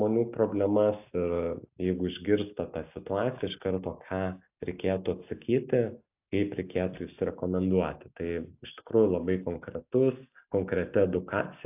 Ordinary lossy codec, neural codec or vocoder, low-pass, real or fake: AAC, 32 kbps; none; 3.6 kHz; real